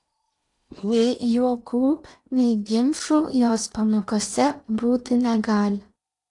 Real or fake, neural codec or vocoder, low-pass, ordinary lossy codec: fake; codec, 16 kHz in and 24 kHz out, 0.8 kbps, FocalCodec, streaming, 65536 codes; 10.8 kHz; AAC, 64 kbps